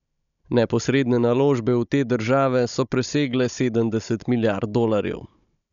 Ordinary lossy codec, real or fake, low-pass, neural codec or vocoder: none; fake; 7.2 kHz; codec, 16 kHz, 16 kbps, FunCodec, trained on Chinese and English, 50 frames a second